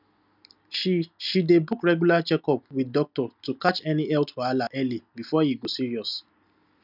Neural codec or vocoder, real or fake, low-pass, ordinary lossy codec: none; real; 5.4 kHz; none